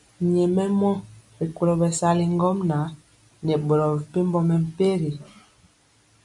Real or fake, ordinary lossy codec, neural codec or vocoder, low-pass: real; MP3, 64 kbps; none; 10.8 kHz